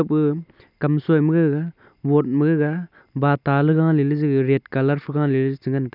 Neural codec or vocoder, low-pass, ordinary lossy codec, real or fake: none; 5.4 kHz; none; real